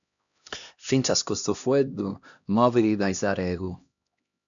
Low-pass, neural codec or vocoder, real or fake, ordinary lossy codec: 7.2 kHz; codec, 16 kHz, 1 kbps, X-Codec, HuBERT features, trained on LibriSpeech; fake; MP3, 96 kbps